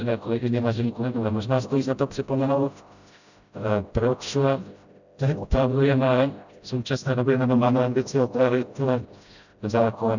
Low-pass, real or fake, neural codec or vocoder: 7.2 kHz; fake; codec, 16 kHz, 0.5 kbps, FreqCodec, smaller model